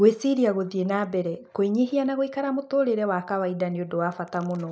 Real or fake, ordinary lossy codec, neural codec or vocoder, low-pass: real; none; none; none